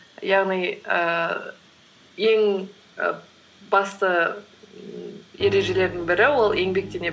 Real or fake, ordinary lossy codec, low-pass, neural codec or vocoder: real; none; none; none